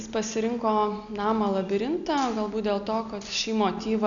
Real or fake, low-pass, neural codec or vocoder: real; 7.2 kHz; none